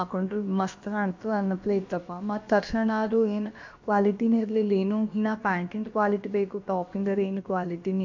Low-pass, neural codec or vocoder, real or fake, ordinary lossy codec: 7.2 kHz; codec, 16 kHz, about 1 kbps, DyCAST, with the encoder's durations; fake; MP3, 48 kbps